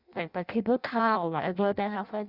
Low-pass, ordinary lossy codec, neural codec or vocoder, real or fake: 5.4 kHz; Opus, 64 kbps; codec, 16 kHz in and 24 kHz out, 0.6 kbps, FireRedTTS-2 codec; fake